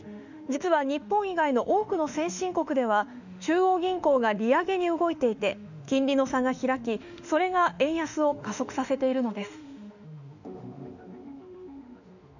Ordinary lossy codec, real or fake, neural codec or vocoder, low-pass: none; fake; autoencoder, 48 kHz, 32 numbers a frame, DAC-VAE, trained on Japanese speech; 7.2 kHz